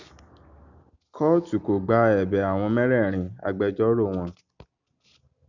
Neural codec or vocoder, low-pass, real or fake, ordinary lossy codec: none; 7.2 kHz; real; none